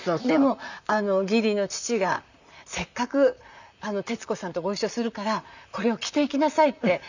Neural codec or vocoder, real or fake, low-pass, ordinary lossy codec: vocoder, 44.1 kHz, 128 mel bands, Pupu-Vocoder; fake; 7.2 kHz; none